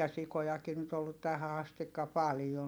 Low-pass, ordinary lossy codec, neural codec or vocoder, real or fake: none; none; vocoder, 44.1 kHz, 128 mel bands every 256 samples, BigVGAN v2; fake